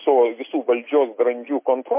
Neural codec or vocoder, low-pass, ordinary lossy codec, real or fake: none; 3.6 kHz; MP3, 24 kbps; real